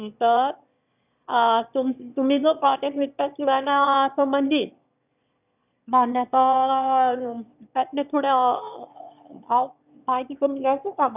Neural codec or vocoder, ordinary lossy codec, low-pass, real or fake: autoencoder, 22.05 kHz, a latent of 192 numbers a frame, VITS, trained on one speaker; none; 3.6 kHz; fake